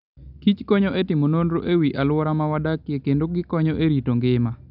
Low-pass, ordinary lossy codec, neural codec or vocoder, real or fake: 5.4 kHz; none; none; real